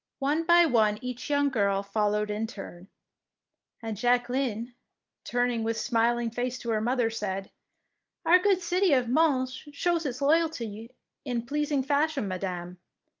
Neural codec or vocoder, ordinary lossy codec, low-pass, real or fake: none; Opus, 24 kbps; 7.2 kHz; real